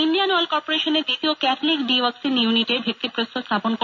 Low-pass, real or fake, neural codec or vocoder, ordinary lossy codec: 7.2 kHz; real; none; none